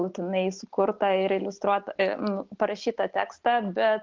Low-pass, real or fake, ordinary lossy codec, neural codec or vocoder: 7.2 kHz; real; Opus, 24 kbps; none